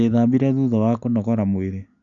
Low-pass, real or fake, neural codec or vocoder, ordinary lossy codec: 7.2 kHz; real; none; none